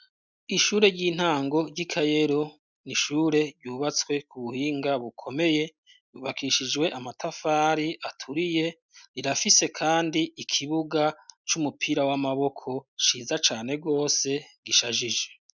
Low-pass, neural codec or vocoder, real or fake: 7.2 kHz; none; real